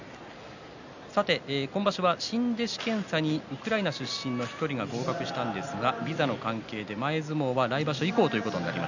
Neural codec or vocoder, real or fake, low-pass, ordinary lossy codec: none; real; 7.2 kHz; none